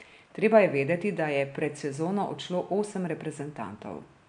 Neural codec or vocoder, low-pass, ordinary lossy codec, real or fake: none; 9.9 kHz; MP3, 64 kbps; real